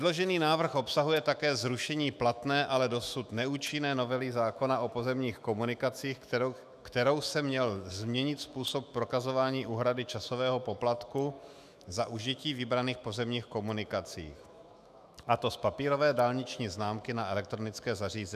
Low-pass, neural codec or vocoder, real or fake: 14.4 kHz; autoencoder, 48 kHz, 128 numbers a frame, DAC-VAE, trained on Japanese speech; fake